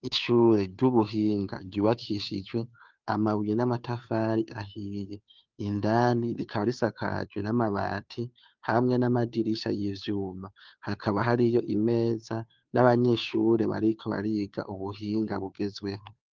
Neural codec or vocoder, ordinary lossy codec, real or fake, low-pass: codec, 16 kHz, 2 kbps, FunCodec, trained on Chinese and English, 25 frames a second; Opus, 24 kbps; fake; 7.2 kHz